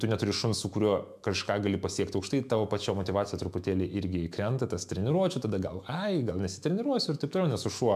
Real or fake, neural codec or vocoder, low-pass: fake; autoencoder, 48 kHz, 128 numbers a frame, DAC-VAE, trained on Japanese speech; 14.4 kHz